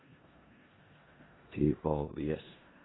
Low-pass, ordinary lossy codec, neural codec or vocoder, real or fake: 7.2 kHz; AAC, 16 kbps; codec, 16 kHz in and 24 kHz out, 0.4 kbps, LongCat-Audio-Codec, four codebook decoder; fake